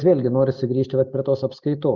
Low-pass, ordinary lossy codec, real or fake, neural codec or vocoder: 7.2 kHz; Opus, 64 kbps; real; none